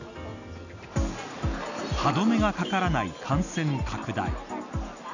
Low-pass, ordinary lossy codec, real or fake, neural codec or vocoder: 7.2 kHz; none; real; none